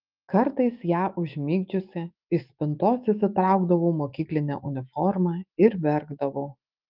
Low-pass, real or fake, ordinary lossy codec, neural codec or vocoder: 5.4 kHz; fake; Opus, 32 kbps; autoencoder, 48 kHz, 128 numbers a frame, DAC-VAE, trained on Japanese speech